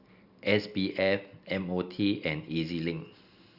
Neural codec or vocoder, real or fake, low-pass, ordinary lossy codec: none; real; 5.4 kHz; Opus, 64 kbps